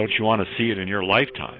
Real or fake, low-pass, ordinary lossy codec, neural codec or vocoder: fake; 5.4 kHz; AAC, 24 kbps; vocoder, 44.1 kHz, 128 mel bands every 512 samples, BigVGAN v2